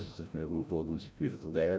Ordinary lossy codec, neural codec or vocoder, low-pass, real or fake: none; codec, 16 kHz, 0.5 kbps, FreqCodec, larger model; none; fake